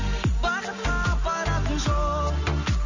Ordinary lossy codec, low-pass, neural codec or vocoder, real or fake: none; 7.2 kHz; none; real